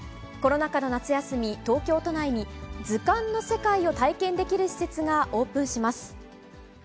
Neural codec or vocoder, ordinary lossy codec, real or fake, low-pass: none; none; real; none